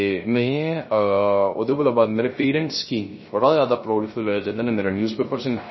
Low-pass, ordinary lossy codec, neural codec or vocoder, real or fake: 7.2 kHz; MP3, 24 kbps; codec, 16 kHz, 0.3 kbps, FocalCodec; fake